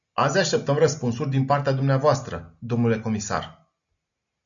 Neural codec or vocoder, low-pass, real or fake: none; 7.2 kHz; real